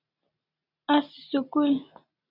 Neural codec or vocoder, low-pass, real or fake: none; 5.4 kHz; real